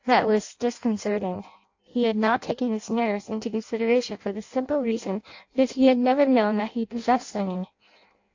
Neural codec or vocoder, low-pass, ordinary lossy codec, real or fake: codec, 16 kHz in and 24 kHz out, 0.6 kbps, FireRedTTS-2 codec; 7.2 kHz; AAC, 48 kbps; fake